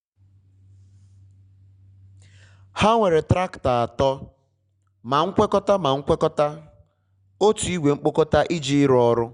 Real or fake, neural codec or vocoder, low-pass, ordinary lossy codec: real; none; 9.9 kHz; Opus, 64 kbps